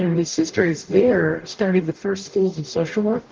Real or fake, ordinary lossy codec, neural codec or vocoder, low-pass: fake; Opus, 16 kbps; codec, 44.1 kHz, 0.9 kbps, DAC; 7.2 kHz